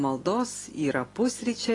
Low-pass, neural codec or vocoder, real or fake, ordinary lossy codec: 10.8 kHz; none; real; AAC, 32 kbps